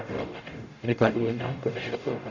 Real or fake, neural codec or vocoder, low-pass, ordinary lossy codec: fake; codec, 44.1 kHz, 0.9 kbps, DAC; 7.2 kHz; none